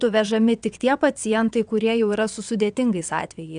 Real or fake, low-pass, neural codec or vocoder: fake; 9.9 kHz; vocoder, 22.05 kHz, 80 mel bands, WaveNeXt